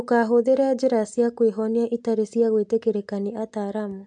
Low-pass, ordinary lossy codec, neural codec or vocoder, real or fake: 9.9 kHz; MP3, 64 kbps; none; real